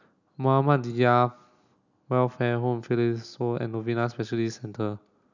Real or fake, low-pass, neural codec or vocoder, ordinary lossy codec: real; 7.2 kHz; none; none